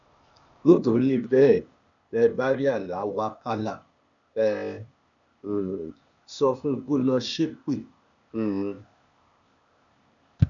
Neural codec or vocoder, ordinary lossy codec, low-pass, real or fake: codec, 16 kHz, 0.8 kbps, ZipCodec; none; 7.2 kHz; fake